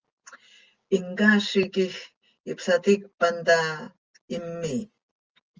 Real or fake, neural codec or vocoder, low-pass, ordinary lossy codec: real; none; 7.2 kHz; Opus, 24 kbps